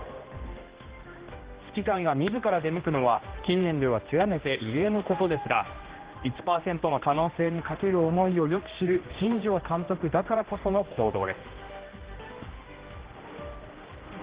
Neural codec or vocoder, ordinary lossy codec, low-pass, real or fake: codec, 16 kHz, 1 kbps, X-Codec, HuBERT features, trained on general audio; Opus, 16 kbps; 3.6 kHz; fake